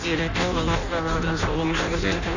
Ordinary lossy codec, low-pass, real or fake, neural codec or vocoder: none; 7.2 kHz; fake; codec, 16 kHz in and 24 kHz out, 0.6 kbps, FireRedTTS-2 codec